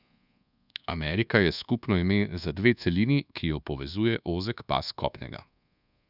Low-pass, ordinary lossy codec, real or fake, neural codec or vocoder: 5.4 kHz; none; fake; codec, 24 kHz, 1.2 kbps, DualCodec